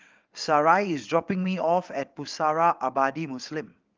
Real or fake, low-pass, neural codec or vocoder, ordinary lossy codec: fake; 7.2 kHz; vocoder, 22.05 kHz, 80 mel bands, WaveNeXt; Opus, 32 kbps